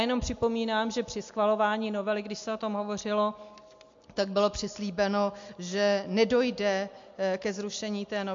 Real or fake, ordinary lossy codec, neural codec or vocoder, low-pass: real; MP3, 48 kbps; none; 7.2 kHz